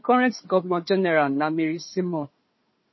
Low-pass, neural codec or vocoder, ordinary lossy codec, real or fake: 7.2 kHz; codec, 16 kHz, 4 kbps, FunCodec, trained on Chinese and English, 50 frames a second; MP3, 24 kbps; fake